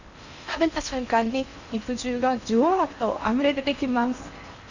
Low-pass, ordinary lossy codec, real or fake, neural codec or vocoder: 7.2 kHz; none; fake; codec, 16 kHz in and 24 kHz out, 0.8 kbps, FocalCodec, streaming, 65536 codes